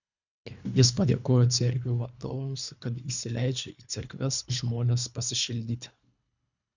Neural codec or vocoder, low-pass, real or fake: codec, 24 kHz, 3 kbps, HILCodec; 7.2 kHz; fake